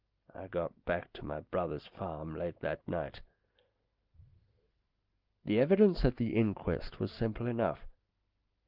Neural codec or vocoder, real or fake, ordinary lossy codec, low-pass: none; real; Opus, 24 kbps; 5.4 kHz